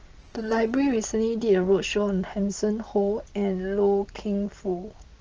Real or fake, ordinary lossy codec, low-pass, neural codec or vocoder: real; Opus, 16 kbps; 7.2 kHz; none